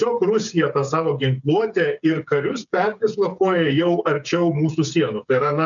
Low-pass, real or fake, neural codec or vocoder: 7.2 kHz; fake; codec, 16 kHz, 8 kbps, FreqCodec, smaller model